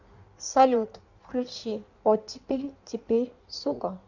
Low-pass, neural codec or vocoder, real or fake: 7.2 kHz; codec, 16 kHz in and 24 kHz out, 1.1 kbps, FireRedTTS-2 codec; fake